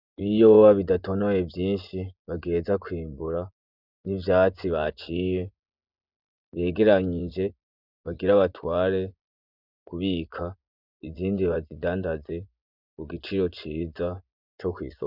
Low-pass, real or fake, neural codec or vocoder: 5.4 kHz; fake; vocoder, 24 kHz, 100 mel bands, Vocos